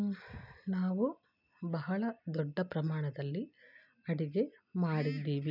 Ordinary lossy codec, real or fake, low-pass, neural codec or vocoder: none; real; 5.4 kHz; none